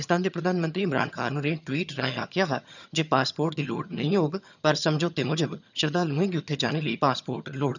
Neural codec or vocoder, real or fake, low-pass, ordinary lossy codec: vocoder, 22.05 kHz, 80 mel bands, HiFi-GAN; fake; 7.2 kHz; none